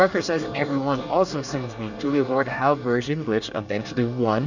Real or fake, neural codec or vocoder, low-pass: fake; codec, 24 kHz, 1 kbps, SNAC; 7.2 kHz